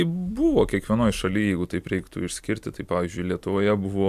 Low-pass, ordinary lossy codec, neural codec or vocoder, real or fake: 14.4 kHz; AAC, 96 kbps; none; real